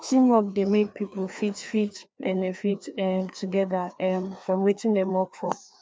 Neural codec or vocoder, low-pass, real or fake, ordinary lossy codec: codec, 16 kHz, 2 kbps, FreqCodec, larger model; none; fake; none